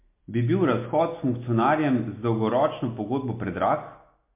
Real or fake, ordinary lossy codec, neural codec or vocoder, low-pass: real; MP3, 24 kbps; none; 3.6 kHz